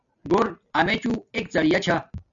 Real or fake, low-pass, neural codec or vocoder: real; 7.2 kHz; none